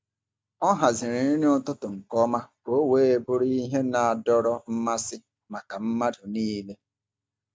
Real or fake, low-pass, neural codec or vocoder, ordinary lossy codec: real; none; none; none